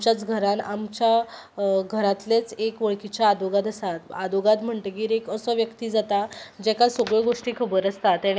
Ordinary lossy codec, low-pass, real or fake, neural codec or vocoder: none; none; real; none